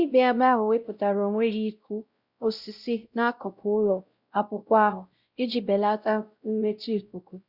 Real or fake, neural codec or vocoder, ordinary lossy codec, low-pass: fake; codec, 16 kHz, 0.5 kbps, X-Codec, WavLM features, trained on Multilingual LibriSpeech; Opus, 64 kbps; 5.4 kHz